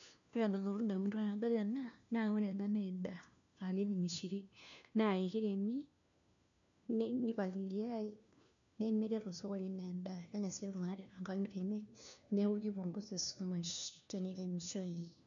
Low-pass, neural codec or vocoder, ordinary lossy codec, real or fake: 7.2 kHz; codec, 16 kHz, 1 kbps, FunCodec, trained on LibriTTS, 50 frames a second; MP3, 96 kbps; fake